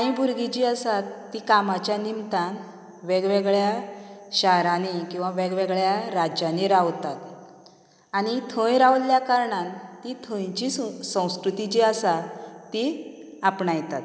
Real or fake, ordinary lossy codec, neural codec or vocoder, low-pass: real; none; none; none